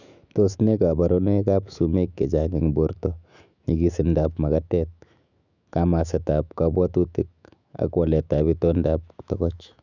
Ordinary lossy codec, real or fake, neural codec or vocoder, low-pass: none; fake; autoencoder, 48 kHz, 128 numbers a frame, DAC-VAE, trained on Japanese speech; 7.2 kHz